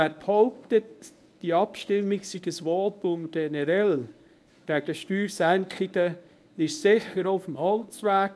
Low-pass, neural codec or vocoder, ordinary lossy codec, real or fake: none; codec, 24 kHz, 0.9 kbps, WavTokenizer, medium speech release version 1; none; fake